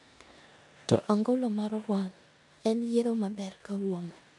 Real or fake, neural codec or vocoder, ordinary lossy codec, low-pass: fake; codec, 16 kHz in and 24 kHz out, 0.9 kbps, LongCat-Audio-Codec, four codebook decoder; none; 10.8 kHz